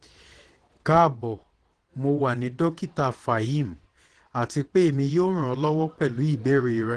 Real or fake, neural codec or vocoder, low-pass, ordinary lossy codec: fake; vocoder, 22.05 kHz, 80 mel bands, WaveNeXt; 9.9 kHz; Opus, 16 kbps